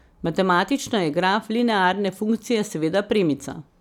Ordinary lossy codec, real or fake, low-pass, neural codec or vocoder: none; real; 19.8 kHz; none